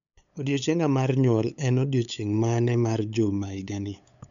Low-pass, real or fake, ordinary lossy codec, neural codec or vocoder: 7.2 kHz; fake; MP3, 96 kbps; codec, 16 kHz, 8 kbps, FunCodec, trained on LibriTTS, 25 frames a second